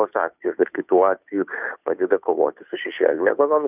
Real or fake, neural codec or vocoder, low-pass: fake; codec, 16 kHz, 2 kbps, FunCodec, trained on Chinese and English, 25 frames a second; 3.6 kHz